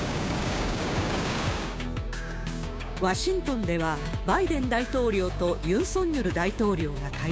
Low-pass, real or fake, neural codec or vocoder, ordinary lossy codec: none; fake; codec, 16 kHz, 6 kbps, DAC; none